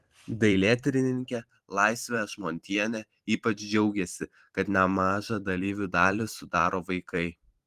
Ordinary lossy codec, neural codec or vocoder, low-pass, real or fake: Opus, 24 kbps; vocoder, 44.1 kHz, 128 mel bands every 512 samples, BigVGAN v2; 14.4 kHz; fake